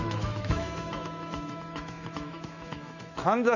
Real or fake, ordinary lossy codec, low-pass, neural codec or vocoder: fake; none; 7.2 kHz; autoencoder, 48 kHz, 128 numbers a frame, DAC-VAE, trained on Japanese speech